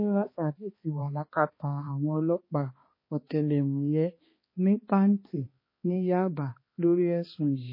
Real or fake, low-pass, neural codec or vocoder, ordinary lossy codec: fake; 5.4 kHz; codec, 16 kHz, 2 kbps, X-Codec, HuBERT features, trained on balanced general audio; MP3, 24 kbps